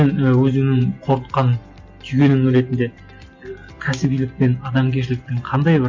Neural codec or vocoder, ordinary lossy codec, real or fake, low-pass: none; MP3, 48 kbps; real; 7.2 kHz